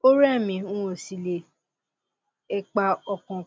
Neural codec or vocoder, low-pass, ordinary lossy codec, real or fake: none; none; none; real